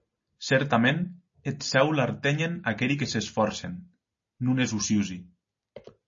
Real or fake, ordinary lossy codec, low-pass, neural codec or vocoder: real; MP3, 32 kbps; 7.2 kHz; none